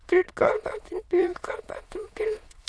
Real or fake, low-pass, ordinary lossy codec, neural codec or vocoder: fake; none; none; autoencoder, 22.05 kHz, a latent of 192 numbers a frame, VITS, trained on many speakers